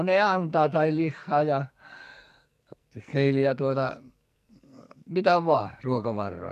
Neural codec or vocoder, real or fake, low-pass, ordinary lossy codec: codec, 44.1 kHz, 2.6 kbps, SNAC; fake; 14.4 kHz; none